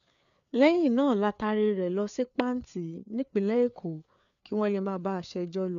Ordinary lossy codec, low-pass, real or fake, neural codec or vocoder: none; 7.2 kHz; fake; codec, 16 kHz, 4 kbps, FreqCodec, larger model